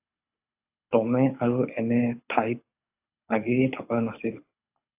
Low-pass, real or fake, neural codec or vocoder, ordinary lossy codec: 3.6 kHz; fake; codec, 24 kHz, 6 kbps, HILCodec; AAC, 32 kbps